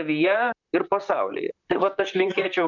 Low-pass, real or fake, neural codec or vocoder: 7.2 kHz; fake; vocoder, 44.1 kHz, 128 mel bands, Pupu-Vocoder